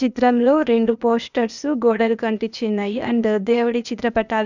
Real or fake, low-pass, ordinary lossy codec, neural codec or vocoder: fake; 7.2 kHz; none; codec, 16 kHz, 0.8 kbps, ZipCodec